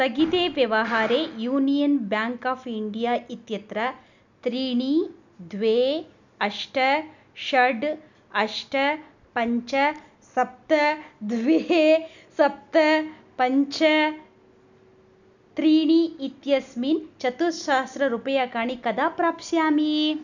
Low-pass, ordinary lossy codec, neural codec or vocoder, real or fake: 7.2 kHz; none; none; real